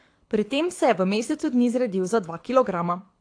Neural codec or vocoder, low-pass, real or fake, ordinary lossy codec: codec, 24 kHz, 6 kbps, HILCodec; 9.9 kHz; fake; AAC, 48 kbps